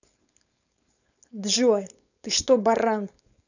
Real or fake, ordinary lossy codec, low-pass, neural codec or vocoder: fake; none; 7.2 kHz; codec, 16 kHz, 4.8 kbps, FACodec